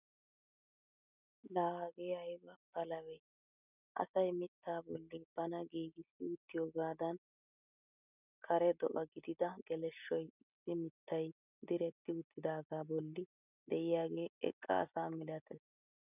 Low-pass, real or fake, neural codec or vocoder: 3.6 kHz; real; none